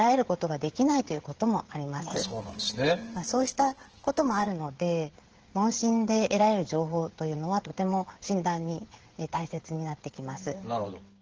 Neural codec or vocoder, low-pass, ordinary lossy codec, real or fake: codec, 16 kHz, 16 kbps, FreqCodec, smaller model; 7.2 kHz; Opus, 16 kbps; fake